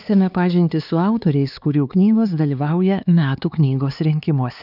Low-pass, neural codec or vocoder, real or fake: 5.4 kHz; codec, 16 kHz, 4 kbps, X-Codec, HuBERT features, trained on LibriSpeech; fake